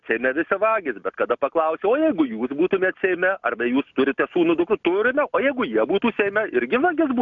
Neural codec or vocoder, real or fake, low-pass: none; real; 7.2 kHz